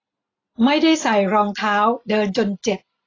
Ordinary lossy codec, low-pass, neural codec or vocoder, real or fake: AAC, 32 kbps; 7.2 kHz; none; real